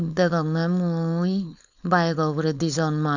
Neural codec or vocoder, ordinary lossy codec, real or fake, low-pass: codec, 16 kHz, 4.8 kbps, FACodec; none; fake; 7.2 kHz